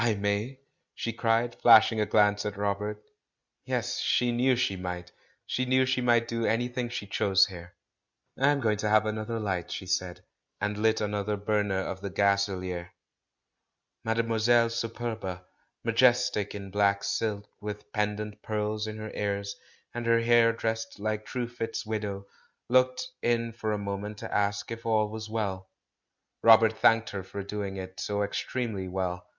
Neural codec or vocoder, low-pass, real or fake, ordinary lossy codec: none; 7.2 kHz; real; Opus, 64 kbps